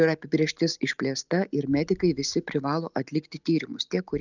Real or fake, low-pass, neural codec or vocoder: real; 7.2 kHz; none